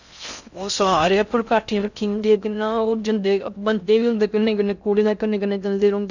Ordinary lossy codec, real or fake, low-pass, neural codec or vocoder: none; fake; 7.2 kHz; codec, 16 kHz in and 24 kHz out, 0.6 kbps, FocalCodec, streaming, 4096 codes